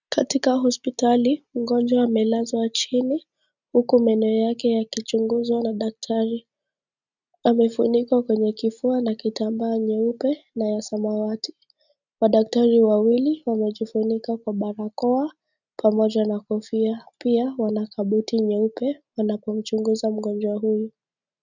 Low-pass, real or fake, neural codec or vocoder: 7.2 kHz; real; none